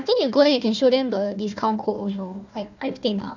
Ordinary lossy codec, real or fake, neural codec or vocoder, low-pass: none; fake; codec, 16 kHz, 1 kbps, FunCodec, trained on Chinese and English, 50 frames a second; 7.2 kHz